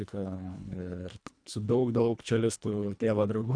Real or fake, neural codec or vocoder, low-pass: fake; codec, 24 kHz, 1.5 kbps, HILCodec; 9.9 kHz